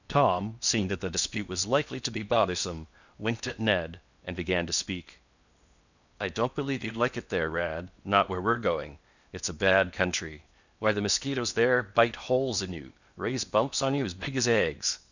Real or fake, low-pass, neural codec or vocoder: fake; 7.2 kHz; codec, 16 kHz in and 24 kHz out, 0.8 kbps, FocalCodec, streaming, 65536 codes